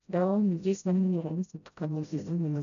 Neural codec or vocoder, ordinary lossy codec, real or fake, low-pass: codec, 16 kHz, 0.5 kbps, FreqCodec, smaller model; none; fake; 7.2 kHz